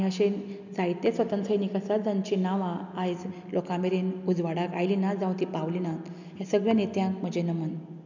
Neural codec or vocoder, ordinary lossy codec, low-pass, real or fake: none; none; 7.2 kHz; real